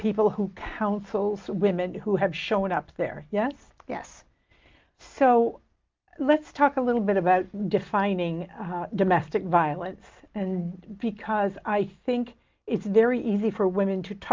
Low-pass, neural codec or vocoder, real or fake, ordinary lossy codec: 7.2 kHz; none; real; Opus, 24 kbps